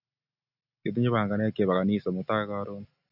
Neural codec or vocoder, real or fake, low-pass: none; real; 5.4 kHz